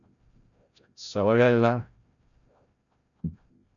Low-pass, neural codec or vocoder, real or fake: 7.2 kHz; codec, 16 kHz, 0.5 kbps, FreqCodec, larger model; fake